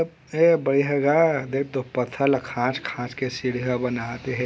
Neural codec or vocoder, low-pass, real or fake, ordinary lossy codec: none; none; real; none